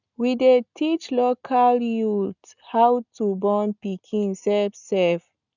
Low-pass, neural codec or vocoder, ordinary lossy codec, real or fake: 7.2 kHz; none; none; real